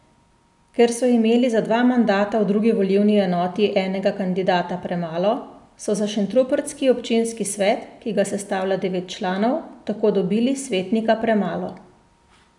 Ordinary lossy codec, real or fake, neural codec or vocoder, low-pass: none; real; none; 10.8 kHz